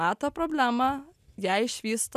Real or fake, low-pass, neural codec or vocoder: real; 14.4 kHz; none